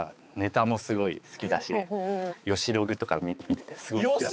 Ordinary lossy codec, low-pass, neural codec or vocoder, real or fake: none; none; codec, 16 kHz, 4 kbps, X-Codec, HuBERT features, trained on balanced general audio; fake